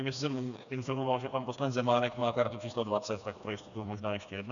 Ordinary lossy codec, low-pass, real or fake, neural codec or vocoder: MP3, 96 kbps; 7.2 kHz; fake; codec, 16 kHz, 2 kbps, FreqCodec, smaller model